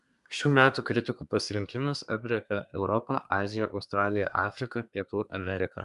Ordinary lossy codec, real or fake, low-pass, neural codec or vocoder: MP3, 96 kbps; fake; 10.8 kHz; codec, 24 kHz, 1 kbps, SNAC